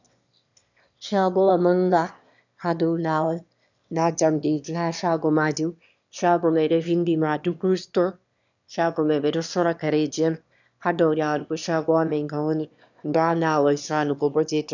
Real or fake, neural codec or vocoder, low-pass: fake; autoencoder, 22.05 kHz, a latent of 192 numbers a frame, VITS, trained on one speaker; 7.2 kHz